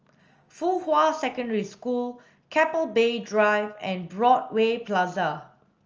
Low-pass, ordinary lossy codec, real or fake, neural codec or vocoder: 7.2 kHz; Opus, 24 kbps; real; none